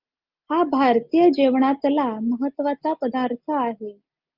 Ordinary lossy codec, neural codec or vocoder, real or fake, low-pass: Opus, 24 kbps; none; real; 5.4 kHz